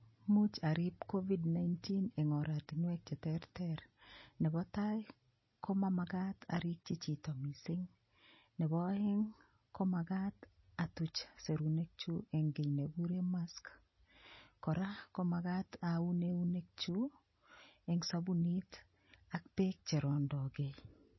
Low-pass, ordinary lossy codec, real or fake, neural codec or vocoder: 7.2 kHz; MP3, 24 kbps; real; none